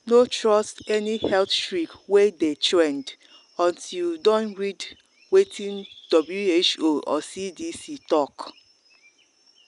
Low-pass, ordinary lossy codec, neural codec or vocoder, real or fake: 10.8 kHz; none; none; real